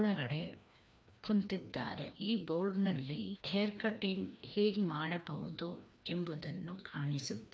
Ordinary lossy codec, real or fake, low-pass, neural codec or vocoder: none; fake; none; codec, 16 kHz, 1 kbps, FreqCodec, larger model